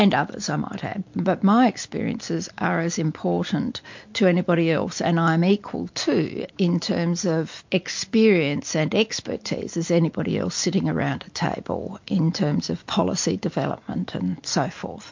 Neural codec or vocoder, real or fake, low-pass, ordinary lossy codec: none; real; 7.2 kHz; MP3, 48 kbps